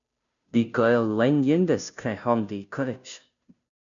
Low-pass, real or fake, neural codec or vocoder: 7.2 kHz; fake; codec, 16 kHz, 0.5 kbps, FunCodec, trained on Chinese and English, 25 frames a second